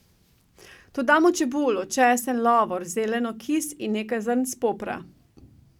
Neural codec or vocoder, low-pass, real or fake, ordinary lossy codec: none; 19.8 kHz; real; none